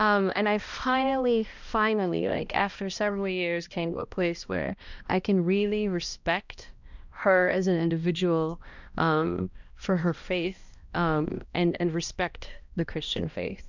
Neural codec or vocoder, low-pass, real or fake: codec, 16 kHz, 1 kbps, X-Codec, HuBERT features, trained on balanced general audio; 7.2 kHz; fake